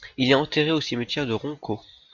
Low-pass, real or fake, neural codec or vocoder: 7.2 kHz; real; none